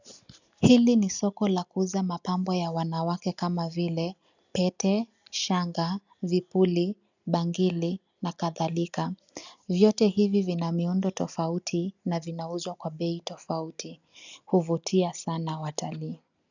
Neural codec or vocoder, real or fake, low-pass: none; real; 7.2 kHz